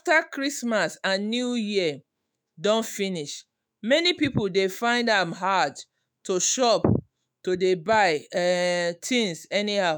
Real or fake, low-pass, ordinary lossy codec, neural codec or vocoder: fake; none; none; autoencoder, 48 kHz, 128 numbers a frame, DAC-VAE, trained on Japanese speech